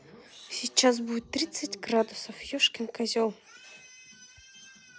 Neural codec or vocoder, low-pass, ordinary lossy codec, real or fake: none; none; none; real